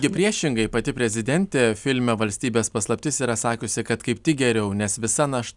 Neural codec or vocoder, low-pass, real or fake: none; 10.8 kHz; real